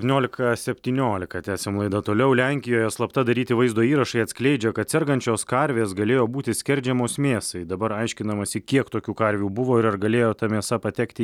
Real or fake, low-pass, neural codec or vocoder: real; 19.8 kHz; none